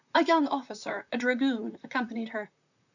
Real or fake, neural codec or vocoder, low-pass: fake; vocoder, 44.1 kHz, 128 mel bands, Pupu-Vocoder; 7.2 kHz